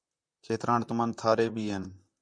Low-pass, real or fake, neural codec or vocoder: 9.9 kHz; fake; vocoder, 44.1 kHz, 128 mel bands, Pupu-Vocoder